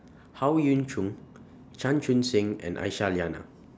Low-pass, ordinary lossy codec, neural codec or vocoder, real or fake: none; none; none; real